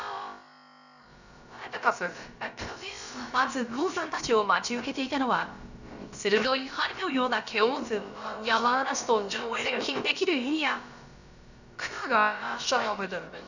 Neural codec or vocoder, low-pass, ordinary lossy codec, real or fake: codec, 16 kHz, about 1 kbps, DyCAST, with the encoder's durations; 7.2 kHz; none; fake